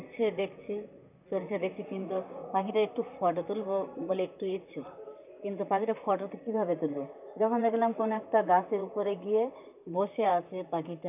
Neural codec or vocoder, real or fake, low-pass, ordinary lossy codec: vocoder, 44.1 kHz, 128 mel bands, Pupu-Vocoder; fake; 3.6 kHz; none